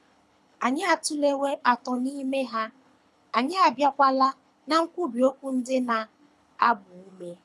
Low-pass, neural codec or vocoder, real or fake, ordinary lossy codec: none; codec, 24 kHz, 6 kbps, HILCodec; fake; none